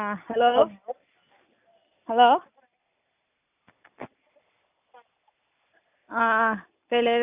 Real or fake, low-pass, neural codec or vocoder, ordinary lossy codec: real; 3.6 kHz; none; none